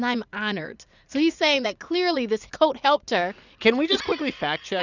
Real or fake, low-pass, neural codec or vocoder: fake; 7.2 kHz; vocoder, 44.1 kHz, 80 mel bands, Vocos